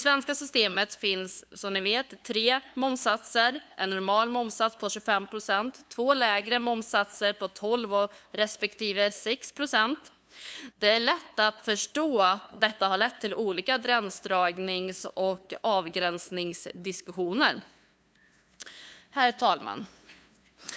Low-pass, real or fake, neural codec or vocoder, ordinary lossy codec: none; fake; codec, 16 kHz, 2 kbps, FunCodec, trained on LibriTTS, 25 frames a second; none